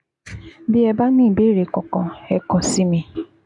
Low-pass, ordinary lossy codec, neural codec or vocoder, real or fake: 10.8 kHz; none; none; real